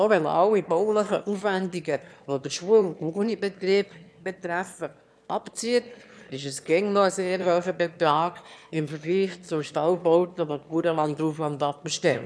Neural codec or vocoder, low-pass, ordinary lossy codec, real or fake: autoencoder, 22.05 kHz, a latent of 192 numbers a frame, VITS, trained on one speaker; none; none; fake